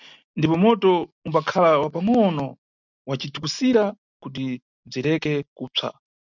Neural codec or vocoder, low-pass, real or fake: none; 7.2 kHz; real